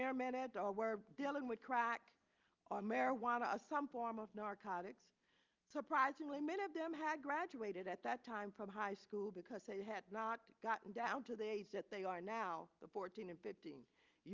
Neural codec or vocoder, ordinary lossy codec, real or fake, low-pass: none; Opus, 24 kbps; real; 7.2 kHz